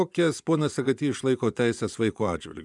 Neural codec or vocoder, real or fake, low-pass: vocoder, 24 kHz, 100 mel bands, Vocos; fake; 10.8 kHz